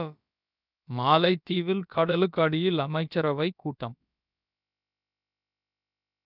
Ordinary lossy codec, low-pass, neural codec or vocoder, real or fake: none; 5.4 kHz; codec, 16 kHz, about 1 kbps, DyCAST, with the encoder's durations; fake